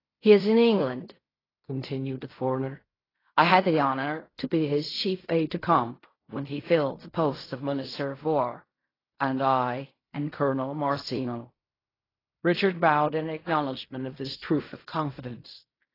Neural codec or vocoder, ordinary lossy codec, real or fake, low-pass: codec, 16 kHz in and 24 kHz out, 0.4 kbps, LongCat-Audio-Codec, fine tuned four codebook decoder; AAC, 24 kbps; fake; 5.4 kHz